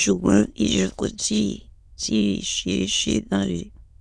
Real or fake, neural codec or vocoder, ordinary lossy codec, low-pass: fake; autoencoder, 22.05 kHz, a latent of 192 numbers a frame, VITS, trained on many speakers; none; none